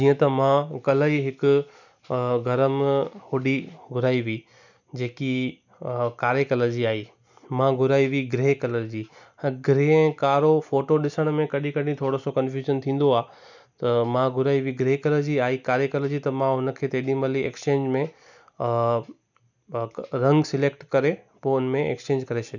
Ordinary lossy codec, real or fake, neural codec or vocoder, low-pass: none; real; none; 7.2 kHz